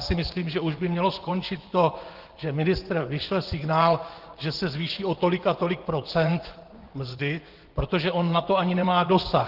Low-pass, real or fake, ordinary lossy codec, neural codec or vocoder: 5.4 kHz; fake; Opus, 16 kbps; vocoder, 24 kHz, 100 mel bands, Vocos